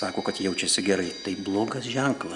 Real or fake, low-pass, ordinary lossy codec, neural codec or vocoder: real; 10.8 kHz; Opus, 64 kbps; none